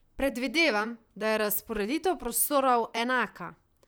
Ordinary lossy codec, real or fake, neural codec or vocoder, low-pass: none; fake; vocoder, 44.1 kHz, 128 mel bands, Pupu-Vocoder; none